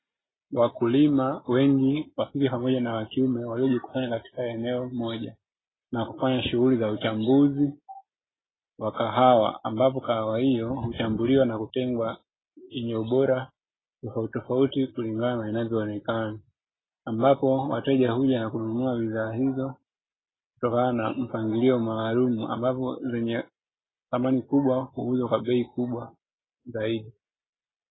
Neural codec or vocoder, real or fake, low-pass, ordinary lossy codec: none; real; 7.2 kHz; AAC, 16 kbps